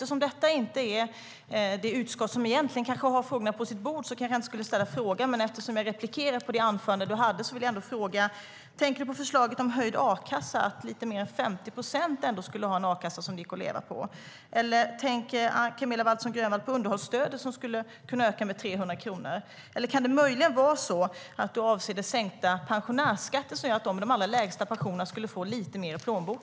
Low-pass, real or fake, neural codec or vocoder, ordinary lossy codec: none; real; none; none